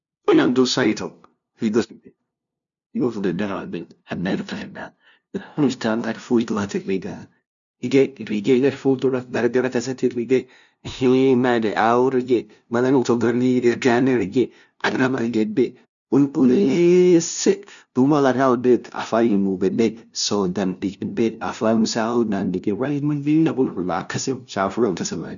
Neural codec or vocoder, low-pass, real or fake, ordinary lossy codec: codec, 16 kHz, 0.5 kbps, FunCodec, trained on LibriTTS, 25 frames a second; 7.2 kHz; fake; none